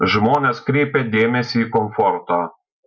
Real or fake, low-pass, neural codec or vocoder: real; 7.2 kHz; none